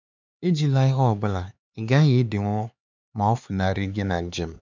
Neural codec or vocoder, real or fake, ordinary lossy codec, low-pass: codec, 16 kHz, 4 kbps, X-Codec, HuBERT features, trained on LibriSpeech; fake; MP3, 64 kbps; 7.2 kHz